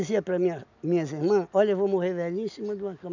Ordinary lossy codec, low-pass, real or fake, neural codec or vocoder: none; 7.2 kHz; real; none